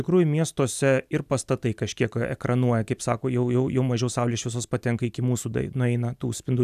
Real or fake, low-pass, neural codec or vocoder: real; 14.4 kHz; none